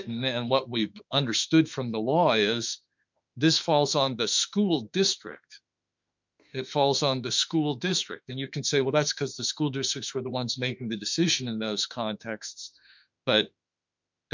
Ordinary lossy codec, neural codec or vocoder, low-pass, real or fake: MP3, 64 kbps; autoencoder, 48 kHz, 32 numbers a frame, DAC-VAE, trained on Japanese speech; 7.2 kHz; fake